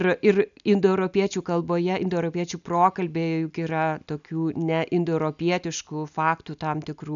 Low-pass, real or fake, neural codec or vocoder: 7.2 kHz; real; none